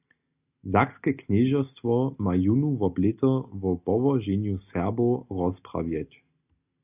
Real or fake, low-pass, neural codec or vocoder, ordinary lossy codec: real; 3.6 kHz; none; AAC, 32 kbps